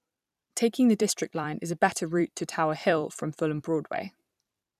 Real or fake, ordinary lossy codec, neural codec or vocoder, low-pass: fake; none; vocoder, 44.1 kHz, 128 mel bands every 256 samples, BigVGAN v2; 14.4 kHz